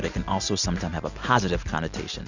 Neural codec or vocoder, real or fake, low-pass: none; real; 7.2 kHz